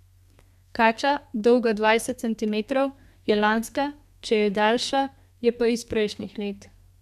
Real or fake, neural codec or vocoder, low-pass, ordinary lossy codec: fake; codec, 32 kHz, 1.9 kbps, SNAC; 14.4 kHz; none